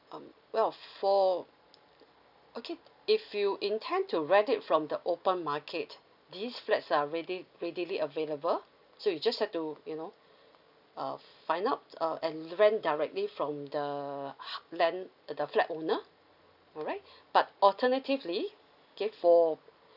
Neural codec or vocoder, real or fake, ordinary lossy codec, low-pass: none; real; none; 5.4 kHz